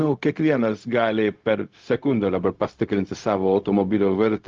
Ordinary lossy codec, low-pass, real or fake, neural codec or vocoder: Opus, 24 kbps; 7.2 kHz; fake; codec, 16 kHz, 0.4 kbps, LongCat-Audio-Codec